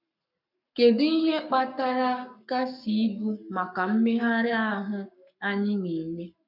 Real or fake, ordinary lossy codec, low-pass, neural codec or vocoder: fake; none; 5.4 kHz; codec, 44.1 kHz, 7.8 kbps, Pupu-Codec